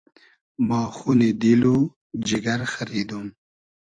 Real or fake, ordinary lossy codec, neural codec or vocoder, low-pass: fake; AAC, 48 kbps; vocoder, 44.1 kHz, 128 mel bands every 256 samples, BigVGAN v2; 9.9 kHz